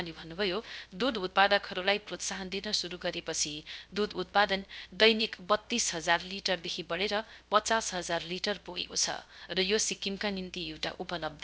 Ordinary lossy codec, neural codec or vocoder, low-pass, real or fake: none; codec, 16 kHz, 0.3 kbps, FocalCodec; none; fake